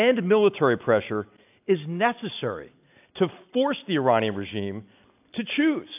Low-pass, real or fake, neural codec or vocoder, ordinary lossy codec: 3.6 kHz; real; none; AAC, 32 kbps